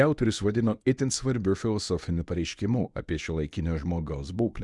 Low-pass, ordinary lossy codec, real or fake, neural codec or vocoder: 10.8 kHz; Opus, 64 kbps; fake; codec, 24 kHz, 0.9 kbps, WavTokenizer, medium speech release version 1